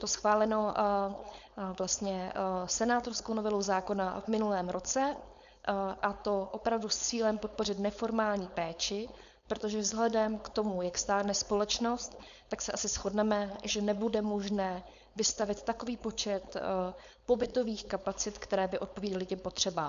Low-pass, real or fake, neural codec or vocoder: 7.2 kHz; fake; codec, 16 kHz, 4.8 kbps, FACodec